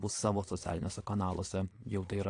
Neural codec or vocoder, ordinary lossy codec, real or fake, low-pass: vocoder, 22.05 kHz, 80 mel bands, Vocos; AAC, 48 kbps; fake; 9.9 kHz